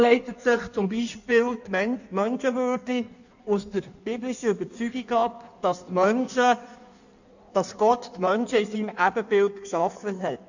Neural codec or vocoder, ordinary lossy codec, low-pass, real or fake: codec, 16 kHz in and 24 kHz out, 1.1 kbps, FireRedTTS-2 codec; none; 7.2 kHz; fake